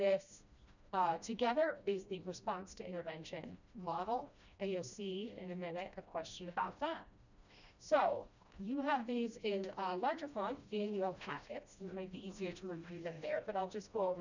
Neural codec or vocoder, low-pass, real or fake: codec, 16 kHz, 1 kbps, FreqCodec, smaller model; 7.2 kHz; fake